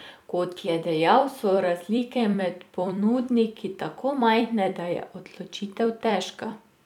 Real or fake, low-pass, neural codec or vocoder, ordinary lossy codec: fake; 19.8 kHz; vocoder, 44.1 kHz, 128 mel bands every 256 samples, BigVGAN v2; none